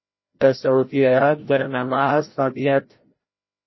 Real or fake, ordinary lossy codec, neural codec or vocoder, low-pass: fake; MP3, 24 kbps; codec, 16 kHz, 0.5 kbps, FreqCodec, larger model; 7.2 kHz